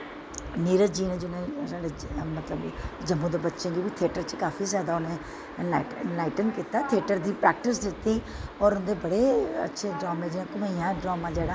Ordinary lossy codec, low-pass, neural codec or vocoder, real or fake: none; none; none; real